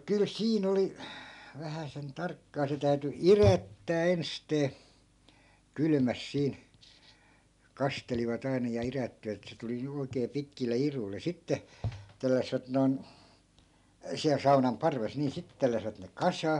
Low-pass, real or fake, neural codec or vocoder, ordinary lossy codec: 10.8 kHz; real; none; none